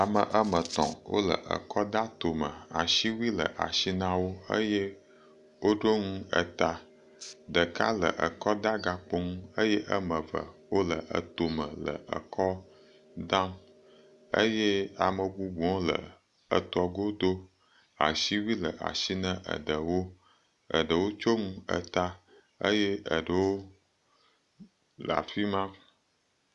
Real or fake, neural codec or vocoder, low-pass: real; none; 10.8 kHz